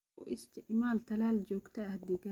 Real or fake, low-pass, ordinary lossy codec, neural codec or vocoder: real; 19.8 kHz; Opus, 32 kbps; none